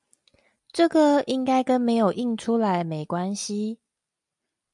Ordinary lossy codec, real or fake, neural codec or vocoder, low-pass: MP3, 96 kbps; real; none; 10.8 kHz